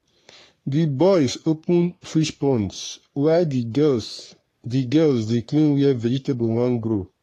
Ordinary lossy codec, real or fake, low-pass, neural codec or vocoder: AAC, 48 kbps; fake; 14.4 kHz; codec, 44.1 kHz, 3.4 kbps, Pupu-Codec